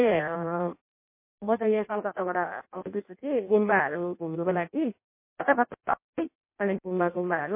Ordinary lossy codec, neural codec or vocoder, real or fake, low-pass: MP3, 24 kbps; codec, 16 kHz in and 24 kHz out, 0.6 kbps, FireRedTTS-2 codec; fake; 3.6 kHz